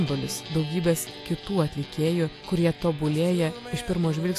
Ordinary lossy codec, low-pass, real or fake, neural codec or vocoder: AAC, 64 kbps; 14.4 kHz; real; none